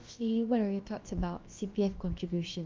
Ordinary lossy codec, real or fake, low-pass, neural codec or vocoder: Opus, 32 kbps; fake; 7.2 kHz; codec, 16 kHz, about 1 kbps, DyCAST, with the encoder's durations